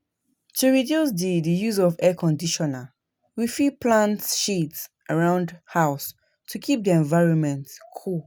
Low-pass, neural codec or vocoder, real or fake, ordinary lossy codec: none; none; real; none